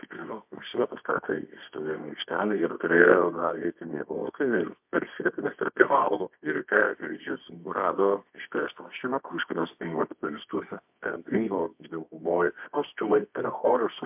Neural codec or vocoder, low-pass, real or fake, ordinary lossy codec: codec, 24 kHz, 0.9 kbps, WavTokenizer, medium music audio release; 3.6 kHz; fake; MP3, 32 kbps